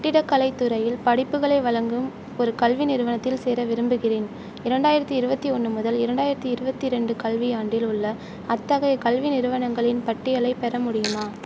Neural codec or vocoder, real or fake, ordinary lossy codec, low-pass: none; real; none; none